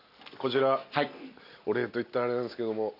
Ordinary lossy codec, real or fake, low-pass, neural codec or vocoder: none; real; 5.4 kHz; none